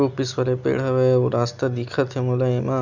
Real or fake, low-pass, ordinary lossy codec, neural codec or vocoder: real; 7.2 kHz; none; none